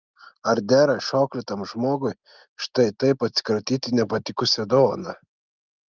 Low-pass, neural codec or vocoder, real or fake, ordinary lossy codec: 7.2 kHz; none; real; Opus, 24 kbps